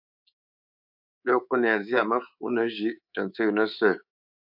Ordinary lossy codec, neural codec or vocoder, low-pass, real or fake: AAC, 48 kbps; codec, 24 kHz, 3.1 kbps, DualCodec; 5.4 kHz; fake